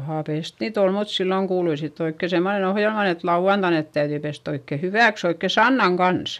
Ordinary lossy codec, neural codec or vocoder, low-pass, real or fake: none; none; 14.4 kHz; real